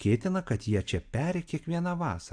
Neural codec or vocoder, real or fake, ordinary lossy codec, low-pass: none; real; AAC, 64 kbps; 9.9 kHz